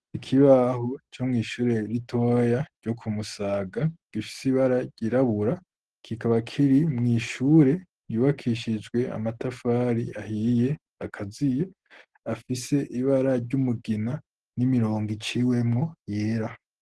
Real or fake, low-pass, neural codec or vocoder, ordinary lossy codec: real; 10.8 kHz; none; Opus, 16 kbps